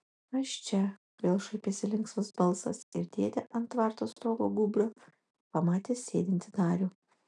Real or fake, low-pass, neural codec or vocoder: real; 10.8 kHz; none